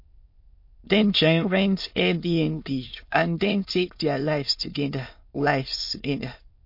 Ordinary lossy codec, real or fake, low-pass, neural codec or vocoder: MP3, 32 kbps; fake; 5.4 kHz; autoencoder, 22.05 kHz, a latent of 192 numbers a frame, VITS, trained on many speakers